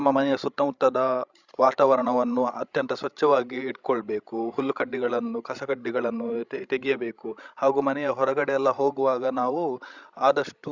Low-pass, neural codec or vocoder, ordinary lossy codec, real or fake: 7.2 kHz; codec, 16 kHz, 16 kbps, FreqCodec, larger model; Opus, 64 kbps; fake